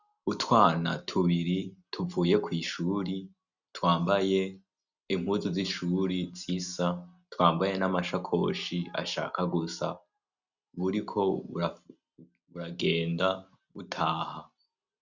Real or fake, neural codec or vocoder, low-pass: real; none; 7.2 kHz